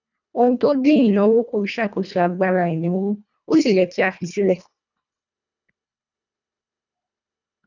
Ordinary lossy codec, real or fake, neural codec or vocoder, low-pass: none; fake; codec, 24 kHz, 1.5 kbps, HILCodec; 7.2 kHz